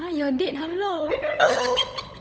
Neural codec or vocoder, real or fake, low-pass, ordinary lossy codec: codec, 16 kHz, 16 kbps, FunCodec, trained on LibriTTS, 50 frames a second; fake; none; none